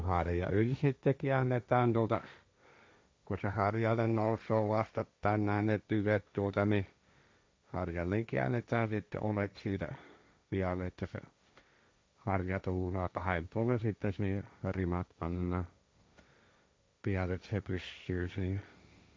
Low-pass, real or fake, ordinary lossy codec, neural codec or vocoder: none; fake; none; codec, 16 kHz, 1.1 kbps, Voila-Tokenizer